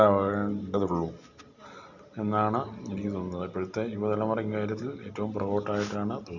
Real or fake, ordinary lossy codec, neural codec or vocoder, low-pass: real; none; none; 7.2 kHz